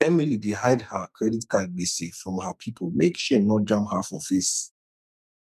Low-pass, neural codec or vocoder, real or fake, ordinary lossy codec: 14.4 kHz; codec, 44.1 kHz, 2.6 kbps, SNAC; fake; none